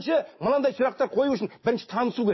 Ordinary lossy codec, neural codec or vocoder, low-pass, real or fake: MP3, 24 kbps; none; 7.2 kHz; real